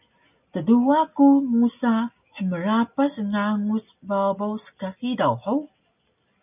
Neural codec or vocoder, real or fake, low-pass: none; real; 3.6 kHz